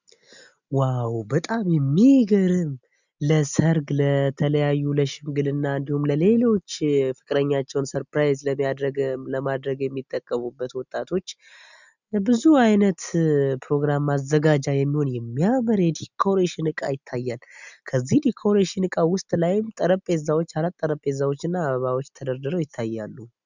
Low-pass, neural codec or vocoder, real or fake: 7.2 kHz; none; real